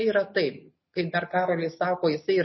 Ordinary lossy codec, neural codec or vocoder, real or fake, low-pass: MP3, 24 kbps; none; real; 7.2 kHz